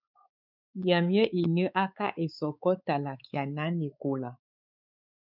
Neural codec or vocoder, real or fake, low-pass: codec, 16 kHz, 4 kbps, X-Codec, WavLM features, trained on Multilingual LibriSpeech; fake; 5.4 kHz